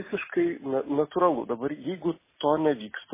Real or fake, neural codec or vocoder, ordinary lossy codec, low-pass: real; none; MP3, 16 kbps; 3.6 kHz